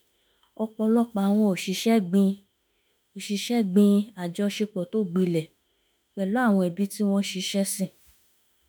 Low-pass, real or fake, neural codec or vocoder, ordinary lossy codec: none; fake; autoencoder, 48 kHz, 32 numbers a frame, DAC-VAE, trained on Japanese speech; none